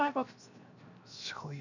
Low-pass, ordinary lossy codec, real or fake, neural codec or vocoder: 7.2 kHz; none; fake; codec, 16 kHz, 0.7 kbps, FocalCodec